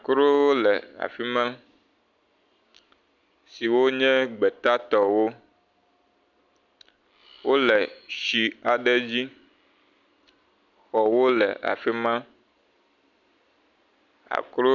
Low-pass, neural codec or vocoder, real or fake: 7.2 kHz; none; real